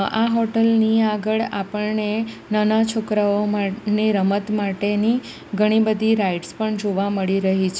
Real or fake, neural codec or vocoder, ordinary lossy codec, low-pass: real; none; none; none